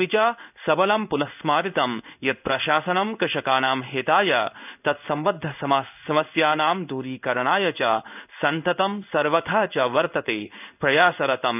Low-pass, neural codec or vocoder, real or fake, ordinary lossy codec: 3.6 kHz; codec, 16 kHz in and 24 kHz out, 1 kbps, XY-Tokenizer; fake; none